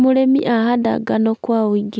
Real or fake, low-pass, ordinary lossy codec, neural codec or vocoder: real; none; none; none